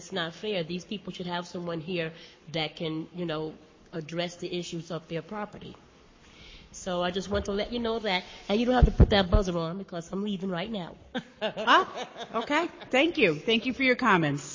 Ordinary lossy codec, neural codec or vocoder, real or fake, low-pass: MP3, 32 kbps; codec, 44.1 kHz, 7.8 kbps, Pupu-Codec; fake; 7.2 kHz